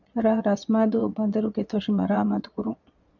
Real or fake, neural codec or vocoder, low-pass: fake; vocoder, 22.05 kHz, 80 mel bands, Vocos; 7.2 kHz